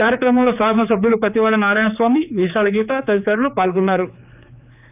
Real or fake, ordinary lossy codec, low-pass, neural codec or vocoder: fake; none; 3.6 kHz; codec, 16 kHz, 4 kbps, X-Codec, HuBERT features, trained on general audio